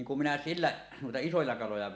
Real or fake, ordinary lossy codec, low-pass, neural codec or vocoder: real; none; none; none